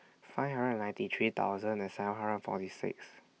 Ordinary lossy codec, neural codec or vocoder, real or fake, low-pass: none; none; real; none